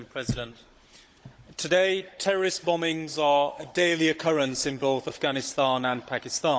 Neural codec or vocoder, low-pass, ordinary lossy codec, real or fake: codec, 16 kHz, 16 kbps, FunCodec, trained on Chinese and English, 50 frames a second; none; none; fake